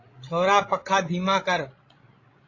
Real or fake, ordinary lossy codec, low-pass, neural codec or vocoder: fake; AAC, 32 kbps; 7.2 kHz; codec, 16 kHz, 16 kbps, FreqCodec, larger model